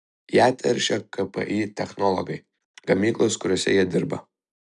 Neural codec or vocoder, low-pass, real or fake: none; 10.8 kHz; real